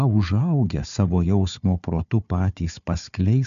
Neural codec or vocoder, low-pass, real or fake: codec, 16 kHz, 4 kbps, FunCodec, trained on Chinese and English, 50 frames a second; 7.2 kHz; fake